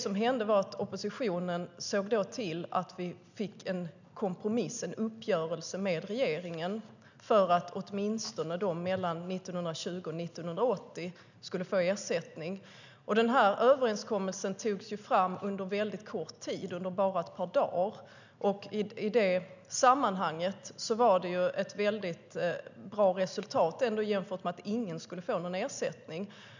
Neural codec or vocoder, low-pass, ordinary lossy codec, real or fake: none; 7.2 kHz; none; real